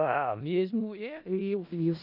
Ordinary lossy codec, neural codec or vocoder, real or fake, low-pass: none; codec, 16 kHz in and 24 kHz out, 0.4 kbps, LongCat-Audio-Codec, four codebook decoder; fake; 5.4 kHz